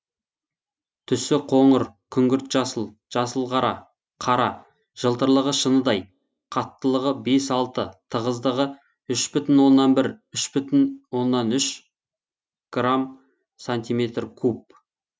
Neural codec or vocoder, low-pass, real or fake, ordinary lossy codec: none; none; real; none